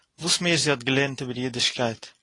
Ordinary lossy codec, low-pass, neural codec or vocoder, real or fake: AAC, 32 kbps; 10.8 kHz; none; real